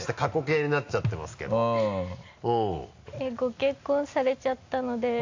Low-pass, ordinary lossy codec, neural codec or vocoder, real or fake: 7.2 kHz; AAC, 48 kbps; none; real